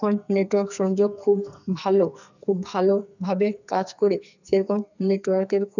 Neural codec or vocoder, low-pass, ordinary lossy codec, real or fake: codec, 44.1 kHz, 2.6 kbps, SNAC; 7.2 kHz; none; fake